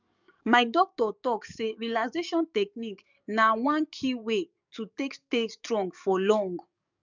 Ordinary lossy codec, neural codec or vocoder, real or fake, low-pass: none; codec, 44.1 kHz, 7.8 kbps, DAC; fake; 7.2 kHz